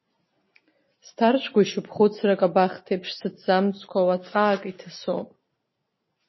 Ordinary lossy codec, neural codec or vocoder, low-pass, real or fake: MP3, 24 kbps; none; 7.2 kHz; real